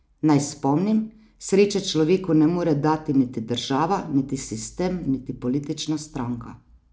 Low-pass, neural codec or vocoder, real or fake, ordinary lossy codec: none; none; real; none